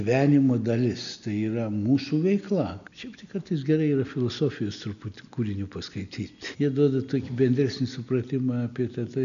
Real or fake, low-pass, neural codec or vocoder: real; 7.2 kHz; none